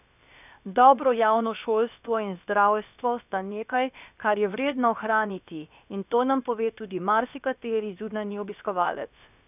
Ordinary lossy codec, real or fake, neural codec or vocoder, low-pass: none; fake; codec, 16 kHz, about 1 kbps, DyCAST, with the encoder's durations; 3.6 kHz